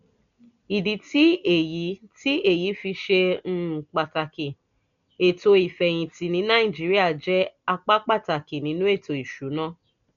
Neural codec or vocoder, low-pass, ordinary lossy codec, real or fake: none; 7.2 kHz; none; real